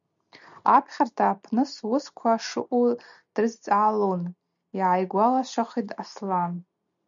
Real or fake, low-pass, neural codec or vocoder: real; 7.2 kHz; none